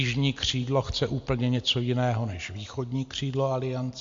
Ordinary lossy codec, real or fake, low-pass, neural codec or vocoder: MP3, 48 kbps; real; 7.2 kHz; none